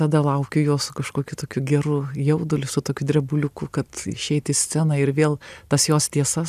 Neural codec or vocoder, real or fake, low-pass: none; real; 14.4 kHz